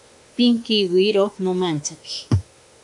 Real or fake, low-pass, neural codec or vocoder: fake; 10.8 kHz; autoencoder, 48 kHz, 32 numbers a frame, DAC-VAE, trained on Japanese speech